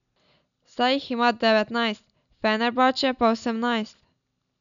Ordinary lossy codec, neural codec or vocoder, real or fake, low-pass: none; none; real; 7.2 kHz